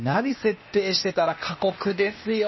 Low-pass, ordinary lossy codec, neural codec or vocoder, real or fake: 7.2 kHz; MP3, 24 kbps; codec, 16 kHz, 0.8 kbps, ZipCodec; fake